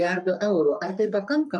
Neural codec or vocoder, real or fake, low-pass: codec, 44.1 kHz, 3.4 kbps, Pupu-Codec; fake; 10.8 kHz